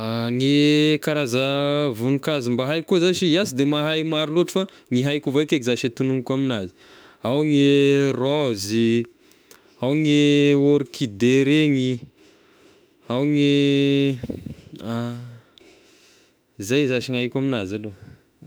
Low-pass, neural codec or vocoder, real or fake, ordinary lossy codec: none; autoencoder, 48 kHz, 32 numbers a frame, DAC-VAE, trained on Japanese speech; fake; none